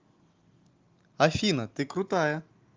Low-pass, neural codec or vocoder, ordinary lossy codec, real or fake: 7.2 kHz; none; Opus, 32 kbps; real